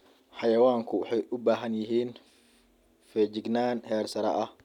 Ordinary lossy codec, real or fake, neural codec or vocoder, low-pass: none; real; none; 19.8 kHz